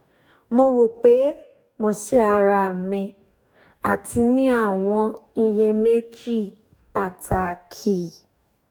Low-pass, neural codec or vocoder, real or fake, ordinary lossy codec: 19.8 kHz; codec, 44.1 kHz, 2.6 kbps, DAC; fake; none